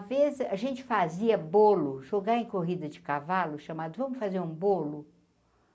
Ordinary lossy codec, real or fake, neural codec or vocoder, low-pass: none; real; none; none